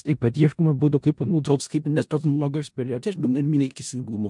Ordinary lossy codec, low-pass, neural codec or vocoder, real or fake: MP3, 64 kbps; 10.8 kHz; codec, 16 kHz in and 24 kHz out, 0.4 kbps, LongCat-Audio-Codec, four codebook decoder; fake